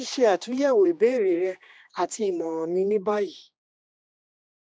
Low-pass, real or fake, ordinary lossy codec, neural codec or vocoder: none; fake; none; codec, 16 kHz, 2 kbps, X-Codec, HuBERT features, trained on general audio